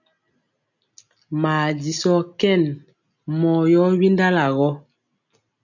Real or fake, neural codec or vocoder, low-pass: real; none; 7.2 kHz